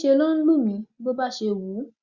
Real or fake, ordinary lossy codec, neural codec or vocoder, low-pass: real; Opus, 64 kbps; none; 7.2 kHz